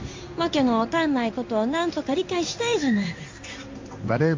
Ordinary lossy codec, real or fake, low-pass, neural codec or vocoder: MP3, 48 kbps; fake; 7.2 kHz; codec, 16 kHz in and 24 kHz out, 1 kbps, XY-Tokenizer